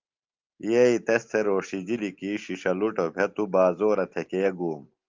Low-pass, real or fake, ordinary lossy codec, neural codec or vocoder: 7.2 kHz; real; Opus, 32 kbps; none